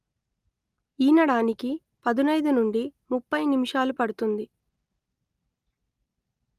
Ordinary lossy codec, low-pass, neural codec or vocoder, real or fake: Opus, 32 kbps; 14.4 kHz; none; real